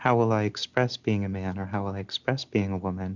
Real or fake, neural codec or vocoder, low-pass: real; none; 7.2 kHz